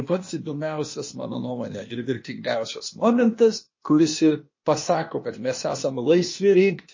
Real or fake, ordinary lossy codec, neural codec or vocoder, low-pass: fake; MP3, 32 kbps; codec, 16 kHz, 0.8 kbps, ZipCodec; 7.2 kHz